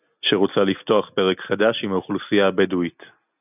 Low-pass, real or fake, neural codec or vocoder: 3.6 kHz; real; none